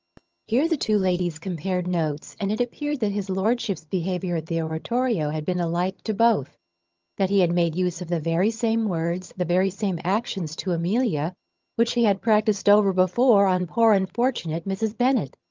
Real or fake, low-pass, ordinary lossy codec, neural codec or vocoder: fake; 7.2 kHz; Opus, 24 kbps; vocoder, 22.05 kHz, 80 mel bands, HiFi-GAN